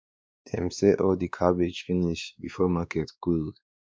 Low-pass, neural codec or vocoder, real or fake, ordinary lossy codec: none; codec, 16 kHz, 2 kbps, X-Codec, WavLM features, trained on Multilingual LibriSpeech; fake; none